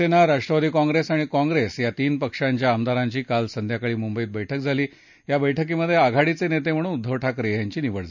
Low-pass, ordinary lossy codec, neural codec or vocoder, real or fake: 7.2 kHz; none; none; real